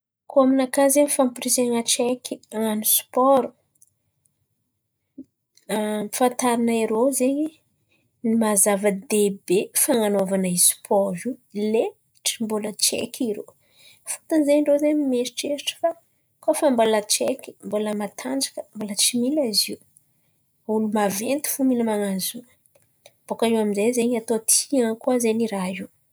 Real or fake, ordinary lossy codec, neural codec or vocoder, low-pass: real; none; none; none